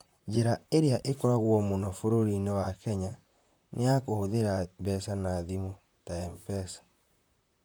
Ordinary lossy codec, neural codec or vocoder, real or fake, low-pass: none; vocoder, 44.1 kHz, 128 mel bands, Pupu-Vocoder; fake; none